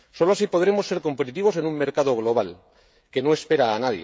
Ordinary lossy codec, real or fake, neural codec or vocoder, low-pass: none; fake; codec, 16 kHz, 16 kbps, FreqCodec, smaller model; none